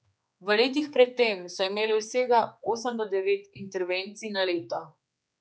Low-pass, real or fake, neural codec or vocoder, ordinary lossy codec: none; fake; codec, 16 kHz, 4 kbps, X-Codec, HuBERT features, trained on general audio; none